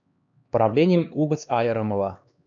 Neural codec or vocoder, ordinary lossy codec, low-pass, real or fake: codec, 16 kHz, 2 kbps, X-Codec, HuBERT features, trained on LibriSpeech; MP3, 64 kbps; 7.2 kHz; fake